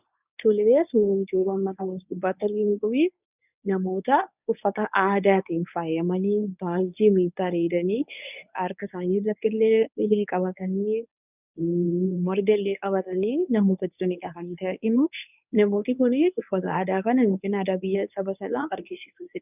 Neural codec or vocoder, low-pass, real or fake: codec, 24 kHz, 0.9 kbps, WavTokenizer, medium speech release version 1; 3.6 kHz; fake